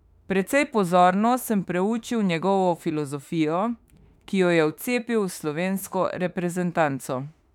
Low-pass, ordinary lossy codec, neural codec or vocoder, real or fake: 19.8 kHz; none; autoencoder, 48 kHz, 32 numbers a frame, DAC-VAE, trained on Japanese speech; fake